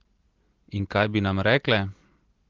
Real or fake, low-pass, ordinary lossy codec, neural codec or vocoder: real; 7.2 kHz; Opus, 16 kbps; none